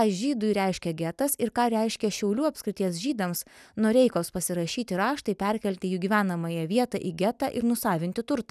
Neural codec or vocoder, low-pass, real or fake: none; 14.4 kHz; real